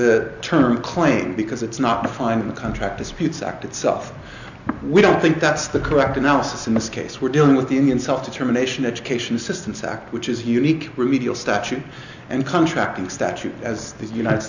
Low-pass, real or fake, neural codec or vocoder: 7.2 kHz; real; none